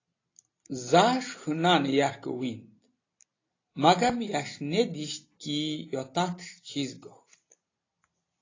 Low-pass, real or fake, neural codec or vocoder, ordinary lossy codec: 7.2 kHz; real; none; AAC, 32 kbps